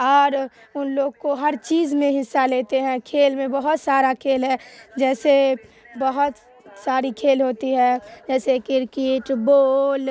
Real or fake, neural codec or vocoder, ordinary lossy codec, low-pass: real; none; none; none